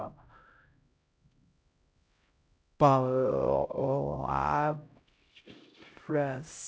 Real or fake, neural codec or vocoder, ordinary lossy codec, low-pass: fake; codec, 16 kHz, 0.5 kbps, X-Codec, HuBERT features, trained on LibriSpeech; none; none